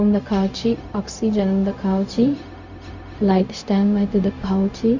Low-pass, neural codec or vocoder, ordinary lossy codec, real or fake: 7.2 kHz; codec, 16 kHz, 0.4 kbps, LongCat-Audio-Codec; none; fake